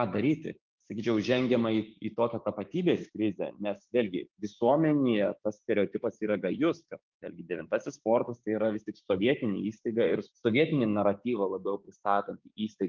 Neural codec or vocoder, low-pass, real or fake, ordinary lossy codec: codec, 16 kHz, 6 kbps, DAC; 7.2 kHz; fake; Opus, 24 kbps